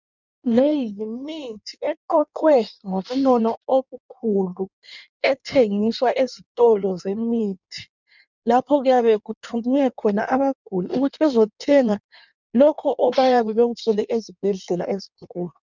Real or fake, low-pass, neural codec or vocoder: fake; 7.2 kHz; codec, 16 kHz in and 24 kHz out, 1.1 kbps, FireRedTTS-2 codec